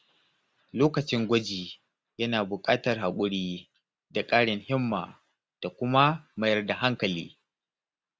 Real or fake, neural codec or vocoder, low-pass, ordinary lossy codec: real; none; none; none